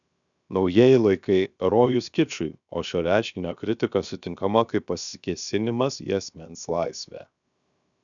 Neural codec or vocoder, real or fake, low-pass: codec, 16 kHz, 0.7 kbps, FocalCodec; fake; 7.2 kHz